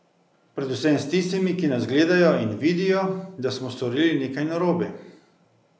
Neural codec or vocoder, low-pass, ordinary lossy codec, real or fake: none; none; none; real